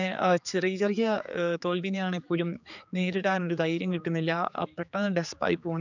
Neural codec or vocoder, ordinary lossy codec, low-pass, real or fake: codec, 16 kHz, 4 kbps, X-Codec, HuBERT features, trained on general audio; none; 7.2 kHz; fake